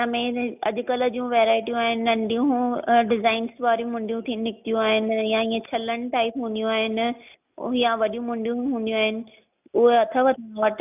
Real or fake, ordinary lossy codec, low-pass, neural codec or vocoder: real; none; 3.6 kHz; none